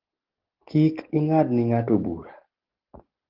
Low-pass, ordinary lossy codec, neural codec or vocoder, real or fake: 5.4 kHz; Opus, 16 kbps; none; real